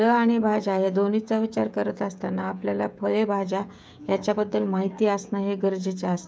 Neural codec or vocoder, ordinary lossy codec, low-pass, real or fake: codec, 16 kHz, 16 kbps, FreqCodec, smaller model; none; none; fake